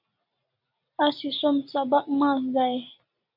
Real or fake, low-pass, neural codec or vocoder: real; 5.4 kHz; none